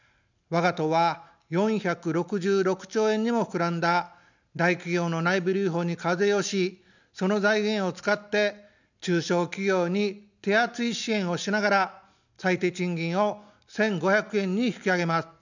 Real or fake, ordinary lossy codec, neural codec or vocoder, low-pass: real; none; none; 7.2 kHz